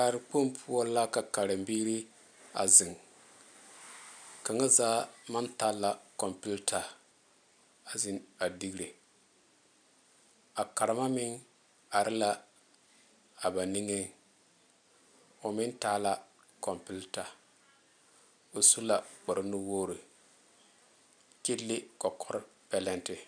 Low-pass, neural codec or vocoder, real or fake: 9.9 kHz; none; real